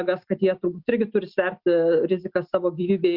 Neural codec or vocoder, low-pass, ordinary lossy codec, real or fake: none; 5.4 kHz; Opus, 64 kbps; real